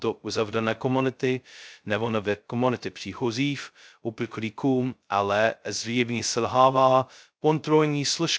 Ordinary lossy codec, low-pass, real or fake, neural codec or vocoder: none; none; fake; codec, 16 kHz, 0.2 kbps, FocalCodec